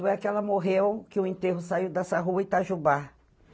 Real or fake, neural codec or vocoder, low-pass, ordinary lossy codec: real; none; none; none